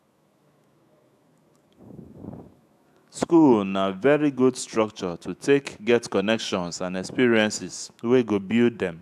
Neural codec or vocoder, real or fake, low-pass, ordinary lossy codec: autoencoder, 48 kHz, 128 numbers a frame, DAC-VAE, trained on Japanese speech; fake; 14.4 kHz; AAC, 96 kbps